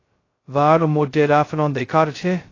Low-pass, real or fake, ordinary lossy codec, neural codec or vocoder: 7.2 kHz; fake; AAC, 32 kbps; codec, 16 kHz, 0.2 kbps, FocalCodec